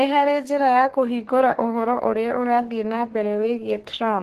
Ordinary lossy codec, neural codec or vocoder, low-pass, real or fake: Opus, 16 kbps; codec, 32 kHz, 1.9 kbps, SNAC; 14.4 kHz; fake